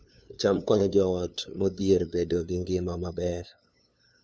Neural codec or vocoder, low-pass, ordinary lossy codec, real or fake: codec, 16 kHz, 2 kbps, FunCodec, trained on LibriTTS, 25 frames a second; none; none; fake